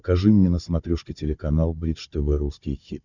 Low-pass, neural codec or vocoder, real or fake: 7.2 kHz; codec, 16 kHz, 16 kbps, FunCodec, trained on Chinese and English, 50 frames a second; fake